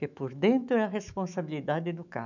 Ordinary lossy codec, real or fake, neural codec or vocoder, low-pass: none; fake; autoencoder, 48 kHz, 128 numbers a frame, DAC-VAE, trained on Japanese speech; 7.2 kHz